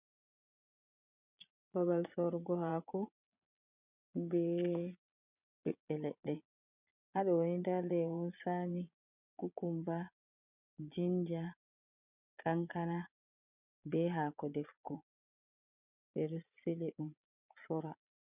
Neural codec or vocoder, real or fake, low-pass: vocoder, 22.05 kHz, 80 mel bands, Vocos; fake; 3.6 kHz